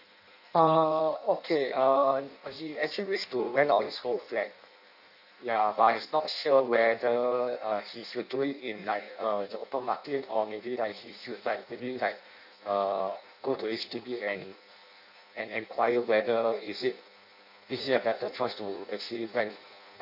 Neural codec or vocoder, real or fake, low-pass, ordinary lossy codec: codec, 16 kHz in and 24 kHz out, 0.6 kbps, FireRedTTS-2 codec; fake; 5.4 kHz; none